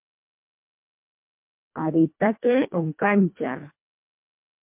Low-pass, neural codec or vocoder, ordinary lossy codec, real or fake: 3.6 kHz; codec, 24 kHz, 1.5 kbps, HILCodec; MP3, 32 kbps; fake